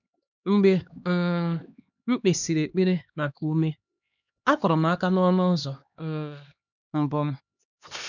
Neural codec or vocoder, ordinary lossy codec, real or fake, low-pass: codec, 16 kHz, 2 kbps, X-Codec, HuBERT features, trained on LibriSpeech; none; fake; 7.2 kHz